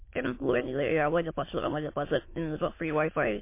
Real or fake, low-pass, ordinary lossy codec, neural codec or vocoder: fake; 3.6 kHz; MP3, 24 kbps; autoencoder, 22.05 kHz, a latent of 192 numbers a frame, VITS, trained on many speakers